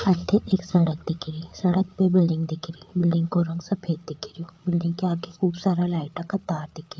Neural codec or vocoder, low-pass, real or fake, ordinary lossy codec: codec, 16 kHz, 8 kbps, FreqCodec, larger model; none; fake; none